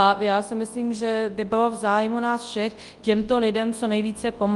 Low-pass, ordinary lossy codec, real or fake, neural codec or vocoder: 10.8 kHz; Opus, 32 kbps; fake; codec, 24 kHz, 0.9 kbps, WavTokenizer, large speech release